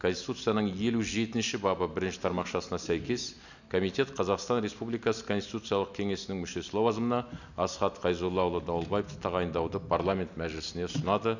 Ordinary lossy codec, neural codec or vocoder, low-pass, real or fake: none; none; 7.2 kHz; real